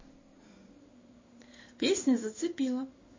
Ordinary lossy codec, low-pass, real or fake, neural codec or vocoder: MP3, 32 kbps; 7.2 kHz; fake; codec, 16 kHz in and 24 kHz out, 1 kbps, XY-Tokenizer